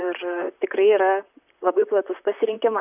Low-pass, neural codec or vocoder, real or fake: 3.6 kHz; vocoder, 44.1 kHz, 128 mel bands, Pupu-Vocoder; fake